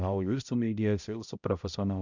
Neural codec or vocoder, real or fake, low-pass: codec, 16 kHz, 0.5 kbps, X-Codec, HuBERT features, trained on balanced general audio; fake; 7.2 kHz